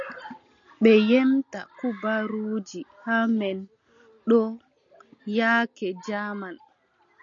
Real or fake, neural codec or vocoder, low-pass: real; none; 7.2 kHz